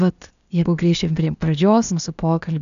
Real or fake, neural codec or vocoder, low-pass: fake; codec, 16 kHz, 0.8 kbps, ZipCodec; 7.2 kHz